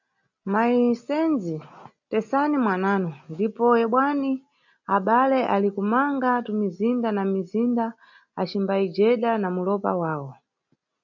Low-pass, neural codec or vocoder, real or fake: 7.2 kHz; none; real